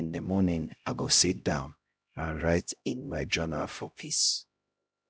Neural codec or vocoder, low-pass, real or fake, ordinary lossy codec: codec, 16 kHz, 0.5 kbps, X-Codec, HuBERT features, trained on LibriSpeech; none; fake; none